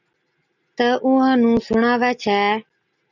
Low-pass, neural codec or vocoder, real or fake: 7.2 kHz; none; real